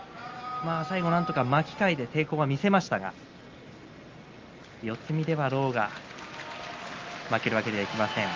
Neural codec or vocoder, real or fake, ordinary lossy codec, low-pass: none; real; Opus, 32 kbps; 7.2 kHz